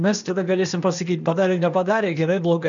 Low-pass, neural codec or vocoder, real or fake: 7.2 kHz; codec, 16 kHz, 0.8 kbps, ZipCodec; fake